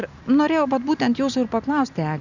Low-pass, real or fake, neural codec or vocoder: 7.2 kHz; real; none